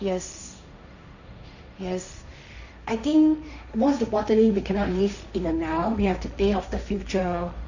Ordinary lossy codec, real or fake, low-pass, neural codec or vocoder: none; fake; 7.2 kHz; codec, 16 kHz, 1.1 kbps, Voila-Tokenizer